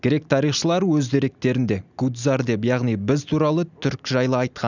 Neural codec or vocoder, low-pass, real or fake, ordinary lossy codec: none; 7.2 kHz; real; none